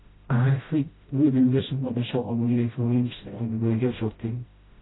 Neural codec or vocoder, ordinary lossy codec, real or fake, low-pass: codec, 16 kHz, 0.5 kbps, FreqCodec, smaller model; AAC, 16 kbps; fake; 7.2 kHz